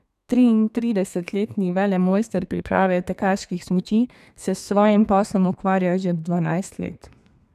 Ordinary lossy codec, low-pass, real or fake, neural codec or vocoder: none; 14.4 kHz; fake; codec, 32 kHz, 1.9 kbps, SNAC